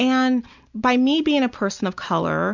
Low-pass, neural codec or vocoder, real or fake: 7.2 kHz; none; real